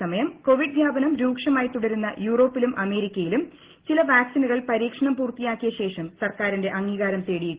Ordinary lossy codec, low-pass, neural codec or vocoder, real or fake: Opus, 16 kbps; 3.6 kHz; none; real